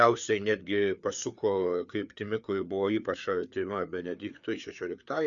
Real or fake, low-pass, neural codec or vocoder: fake; 7.2 kHz; codec, 16 kHz, 4 kbps, FreqCodec, larger model